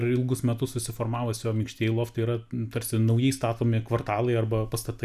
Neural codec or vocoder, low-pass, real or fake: none; 14.4 kHz; real